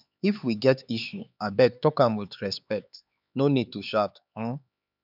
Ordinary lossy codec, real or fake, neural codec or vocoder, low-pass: none; fake; codec, 16 kHz, 4 kbps, X-Codec, HuBERT features, trained on LibriSpeech; 5.4 kHz